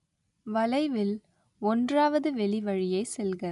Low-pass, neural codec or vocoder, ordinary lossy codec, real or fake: 10.8 kHz; none; none; real